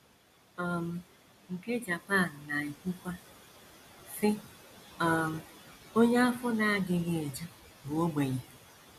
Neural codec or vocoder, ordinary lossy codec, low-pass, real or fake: none; none; 14.4 kHz; real